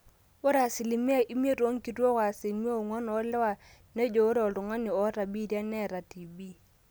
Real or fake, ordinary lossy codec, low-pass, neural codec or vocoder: real; none; none; none